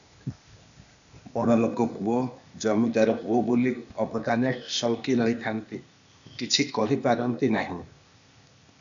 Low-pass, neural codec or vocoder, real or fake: 7.2 kHz; codec, 16 kHz, 0.8 kbps, ZipCodec; fake